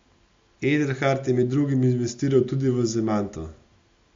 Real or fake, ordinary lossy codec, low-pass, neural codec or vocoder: real; MP3, 48 kbps; 7.2 kHz; none